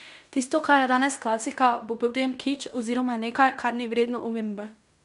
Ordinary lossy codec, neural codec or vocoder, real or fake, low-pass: none; codec, 16 kHz in and 24 kHz out, 0.9 kbps, LongCat-Audio-Codec, fine tuned four codebook decoder; fake; 10.8 kHz